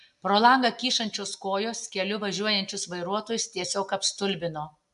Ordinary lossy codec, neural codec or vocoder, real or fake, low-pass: AAC, 96 kbps; none; real; 10.8 kHz